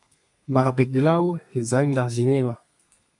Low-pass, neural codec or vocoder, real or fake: 10.8 kHz; codec, 32 kHz, 1.9 kbps, SNAC; fake